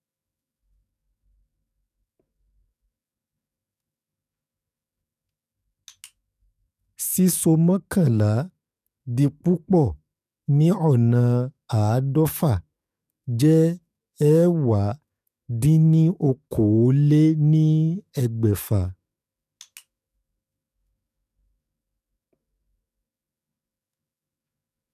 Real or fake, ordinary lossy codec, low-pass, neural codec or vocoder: fake; none; 14.4 kHz; codec, 44.1 kHz, 7.8 kbps, DAC